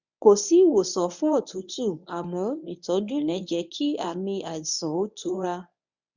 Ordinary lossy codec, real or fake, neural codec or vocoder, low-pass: none; fake; codec, 24 kHz, 0.9 kbps, WavTokenizer, medium speech release version 1; 7.2 kHz